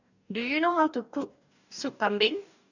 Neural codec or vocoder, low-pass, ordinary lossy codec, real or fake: codec, 44.1 kHz, 2.6 kbps, DAC; 7.2 kHz; none; fake